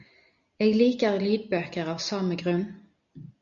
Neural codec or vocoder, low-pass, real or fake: none; 7.2 kHz; real